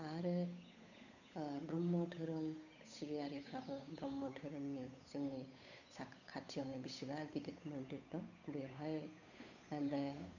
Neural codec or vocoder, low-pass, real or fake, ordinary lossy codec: codec, 16 kHz, 8 kbps, FunCodec, trained on Chinese and English, 25 frames a second; 7.2 kHz; fake; none